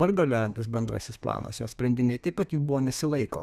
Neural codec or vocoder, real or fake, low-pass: codec, 32 kHz, 1.9 kbps, SNAC; fake; 14.4 kHz